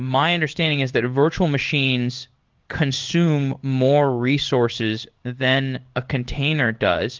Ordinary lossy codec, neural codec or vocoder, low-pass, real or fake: Opus, 32 kbps; codec, 16 kHz in and 24 kHz out, 1 kbps, XY-Tokenizer; 7.2 kHz; fake